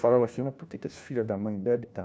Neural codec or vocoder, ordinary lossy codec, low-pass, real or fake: codec, 16 kHz, 1 kbps, FunCodec, trained on LibriTTS, 50 frames a second; none; none; fake